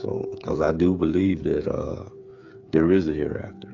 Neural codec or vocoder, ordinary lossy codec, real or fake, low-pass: codec, 16 kHz, 8 kbps, FreqCodec, smaller model; AAC, 48 kbps; fake; 7.2 kHz